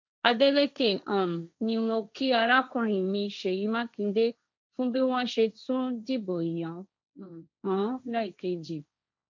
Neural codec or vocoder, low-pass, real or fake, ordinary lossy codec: codec, 16 kHz, 1.1 kbps, Voila-Tokenizer; 7.2 kHz; fake; MP3, 64 kbps